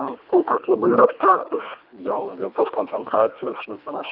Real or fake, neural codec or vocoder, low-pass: fake; codec, 24 kHz, 1.5 kbps, HILCodec; 5.4 kHz